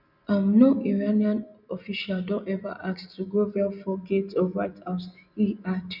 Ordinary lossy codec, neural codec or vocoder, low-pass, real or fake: none; none; 5.4 kHz; real